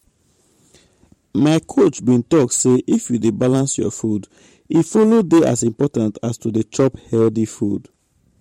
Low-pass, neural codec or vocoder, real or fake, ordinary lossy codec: 19.8 kHz; vocoder, 44.1 kHz, 128 mel bands every 512 samples, BigVGAN v2; fake; MP3, 64 kbps